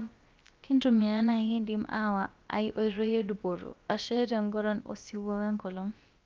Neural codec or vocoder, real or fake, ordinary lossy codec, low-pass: codec, 16 kHz, about 1 kbps, DyCAST, with the encoder's durations; fake; Opus, 24 kbps; 7.2 kHz